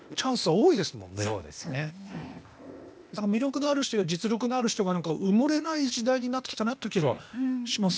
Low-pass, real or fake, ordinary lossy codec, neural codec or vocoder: none; fake; none; codec, 16 kHz, 0.8 kbps, ZipCodec